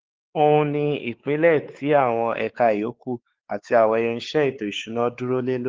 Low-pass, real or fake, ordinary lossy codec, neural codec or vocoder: 7.2 kHz; fake; Opus, 16 kbps; codec, 16 kHz, 4 kbps, X-Codec, WavLM features, trained on Multilingual LibriSpeech